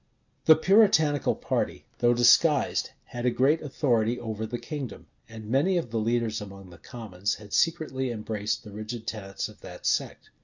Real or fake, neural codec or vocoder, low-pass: real; none; 7.2 kHz